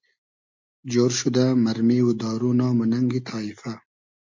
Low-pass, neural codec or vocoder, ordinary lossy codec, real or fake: 7.2 kHz; none; MP3, 48 kbps; real